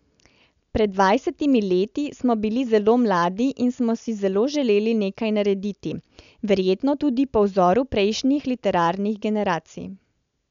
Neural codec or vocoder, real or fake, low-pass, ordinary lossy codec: none; real; 7.2 kHz; none